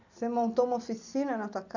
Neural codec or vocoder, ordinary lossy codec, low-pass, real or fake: none; none; 7.2 kHz; real